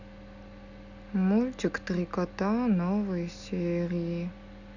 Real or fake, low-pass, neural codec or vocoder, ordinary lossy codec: real; 7.2 kHz; none; none